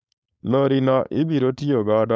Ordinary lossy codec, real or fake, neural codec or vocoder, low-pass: none; fake; codec, 16 kHz, 4.8 kbps, FACodec; none